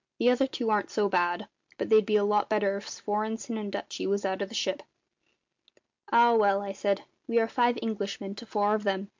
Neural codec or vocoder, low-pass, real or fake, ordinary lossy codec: none; 7.2 kHz; real; MP3, 64 kbps